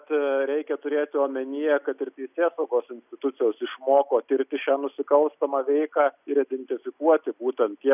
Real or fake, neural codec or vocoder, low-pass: real; none; 3.6 kHz